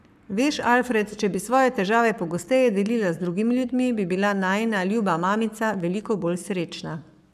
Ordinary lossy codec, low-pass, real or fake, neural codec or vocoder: none; 14.4 kHz; fake; codec, 44.1 kHz, 7.8 kbps, Pupu-Codec